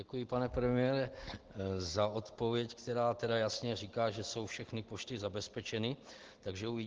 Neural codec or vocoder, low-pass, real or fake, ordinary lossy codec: none; 7.2 kHz; real; Opus, 16 kbps